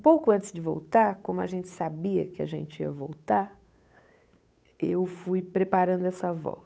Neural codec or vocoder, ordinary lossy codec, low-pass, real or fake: codec, 16 kHz, 8 kbps, FunCodec, trained on Chinese and English, 25 frames a second; none; none; fake